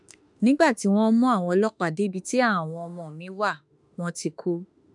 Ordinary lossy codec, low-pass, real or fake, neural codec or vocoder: none; 10.8 kHz; fake; autoencoder, 48 kHz, 32 numbers a frame, DAC-VAE, trained on Japanese speech